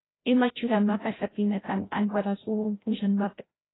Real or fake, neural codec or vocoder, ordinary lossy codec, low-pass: fake; codec, 16 kHz, 0.5 kbps, FreqCodec, larger model; AAC, 16 kbps; 7.2 kHz